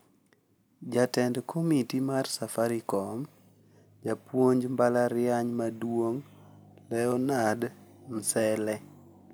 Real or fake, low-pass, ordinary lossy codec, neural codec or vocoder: real; none; none; none